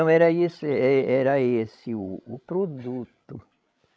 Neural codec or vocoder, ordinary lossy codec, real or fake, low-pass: codec, 16 kHz, 16 kbps, FreqCodec, larger model; none; fake; none